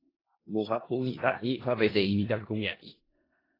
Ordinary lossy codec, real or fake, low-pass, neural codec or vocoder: AAC, 24 kbps; fake; 5.4 kHz; codec, 16 kHz in and 24 kHz out, 0.4 kbps, LongCat-Audio-Codec, four codebook decoder